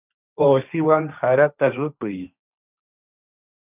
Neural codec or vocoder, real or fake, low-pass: codec, 16 kHz, 1.1 kbps, Voila-Tokenizer; fake; 3.6 kHz